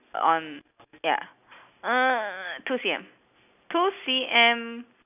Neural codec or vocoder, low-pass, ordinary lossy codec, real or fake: none; 3.6 kHz; none; real